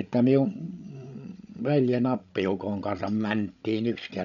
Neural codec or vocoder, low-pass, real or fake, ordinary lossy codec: codec, 16 kHz, 16 kbps, FreqCodec, larger model; 7.2 kHz; fake; none